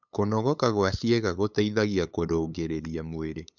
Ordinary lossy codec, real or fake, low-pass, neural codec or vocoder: none; fake; 7.2 kHz; codec, 16 kHz, 8 kbps, FunCodec, trained on LibriTTS, 25 frames a second